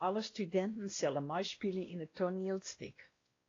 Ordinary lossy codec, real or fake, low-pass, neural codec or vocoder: AAC, 32 kbps; fake; 7.2 kHz; codec, 16 kHz, 1 kbps, X-Codec, WavLM features, trained on Multilingual LibriSpeech